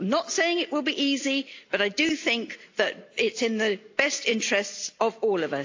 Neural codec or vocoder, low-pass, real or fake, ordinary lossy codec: none; 7.2 kHz; real; AAC, 48 kbps